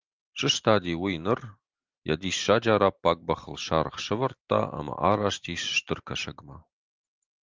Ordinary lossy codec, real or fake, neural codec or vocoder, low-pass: Opus, 32 kbps; real; none; 7.2 kHz